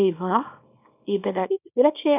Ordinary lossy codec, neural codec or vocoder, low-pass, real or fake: none; codec, 24 kHz, 0.9 kbps, WavTokenizer, small release; 3.6 kHz; fake